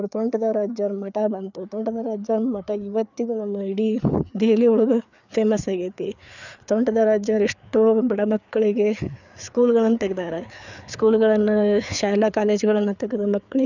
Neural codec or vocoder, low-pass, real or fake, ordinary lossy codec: codec, 16 kHz, 4 kbps, FreqCodec, larger model; 7.2 kHz; fake; none